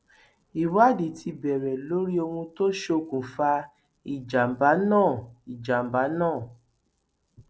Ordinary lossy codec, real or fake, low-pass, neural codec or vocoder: none; real; none; none